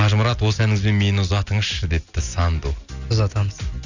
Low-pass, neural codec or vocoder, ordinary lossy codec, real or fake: 7.2 kHz; none; none; real